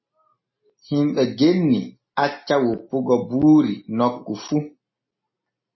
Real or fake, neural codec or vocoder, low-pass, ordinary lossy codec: real; none; 7.2 kHz; MP3, 24 kbps